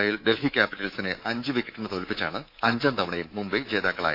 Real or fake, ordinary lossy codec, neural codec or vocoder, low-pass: fake; AAC, 32 kbps; codec, 24 kHz, 3.1 kbps, DualCodec; 5.4 kHz